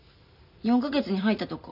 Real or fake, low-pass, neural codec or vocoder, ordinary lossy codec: real; 5.4 kHz; none; none